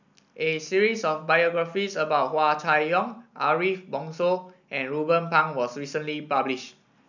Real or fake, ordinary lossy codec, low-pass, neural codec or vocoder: real; none; 7.2 kHz; none